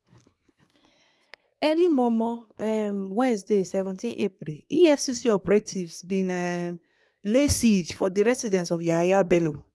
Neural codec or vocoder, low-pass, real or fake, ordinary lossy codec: codec, 24 kHz, 1 kbps, SNAC; none; fake; none